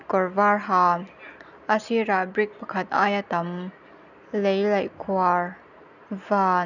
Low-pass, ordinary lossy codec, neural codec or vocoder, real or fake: 7.2 kHz; none; none; real